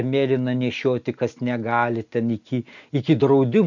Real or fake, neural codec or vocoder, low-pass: real; none; 7.2 kHz